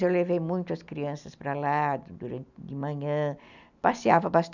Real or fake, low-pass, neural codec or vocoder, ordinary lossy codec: real; 7.2 kHz; none; none